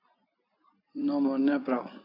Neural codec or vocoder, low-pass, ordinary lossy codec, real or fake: vocoder, 44.1 kHz, 128 mel bands every 256 samples, BigVGAN v2; 5.4 kHz; AAC, 32 kbps; fake